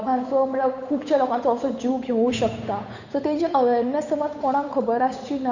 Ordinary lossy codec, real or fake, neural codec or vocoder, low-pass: none; fake; codec, 16 kHz, 8 kbps, FunCodec, trained on Chinese and English, 25 frames a second; 7.2 kHz